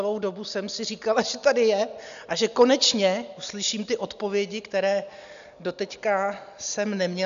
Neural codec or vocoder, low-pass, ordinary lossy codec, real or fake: none; 7.2 kHz; AAC, 96 kbps; real